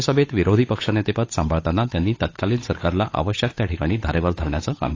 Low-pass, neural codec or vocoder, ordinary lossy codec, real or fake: 7.2 kHz; codec, 16 kHz, 8 kbps, FunCodec, trained on Chinese and English, 25 frames a second; AAC, 32 kbps; fake